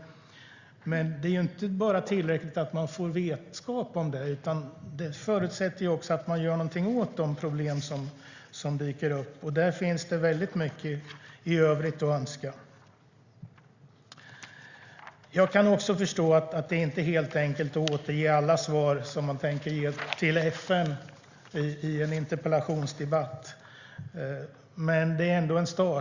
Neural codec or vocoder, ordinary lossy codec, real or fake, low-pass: none; Opus, 64 kbps; real; 7.2 kHz